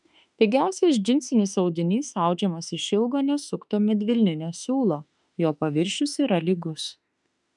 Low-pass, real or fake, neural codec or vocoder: 10.8 kHz; fake; autoencoder, 48 kHz, 32 numbers a frame, DAC-VAE, trained on Japanese speech